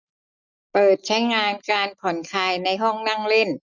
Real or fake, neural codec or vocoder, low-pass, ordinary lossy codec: real; none; 7.2 kHz; none